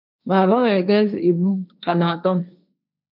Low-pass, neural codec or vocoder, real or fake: 5.4 kHz; codec, 16 kHz, 1.1 kbps, Voila-Tokenizer; fake